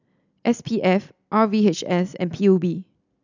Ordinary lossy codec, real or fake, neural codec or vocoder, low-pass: none; real; none; 7.2 kHz